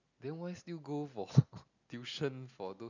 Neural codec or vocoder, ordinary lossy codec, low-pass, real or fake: none; none; 7.2 kHz; real